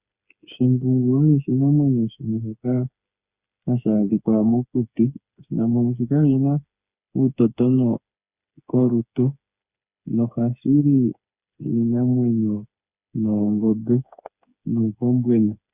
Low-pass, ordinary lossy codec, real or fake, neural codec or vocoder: 3.6 kHz; Opus, 64 kbps; fake; codec, 16 kHz, 4 kbps, FreqCodec, smaller model